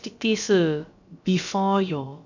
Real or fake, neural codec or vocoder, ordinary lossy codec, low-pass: fake; codec, 16 kHz, about 1 kbps, DyCAST, with the encoder's durations; none; 7.2 kHz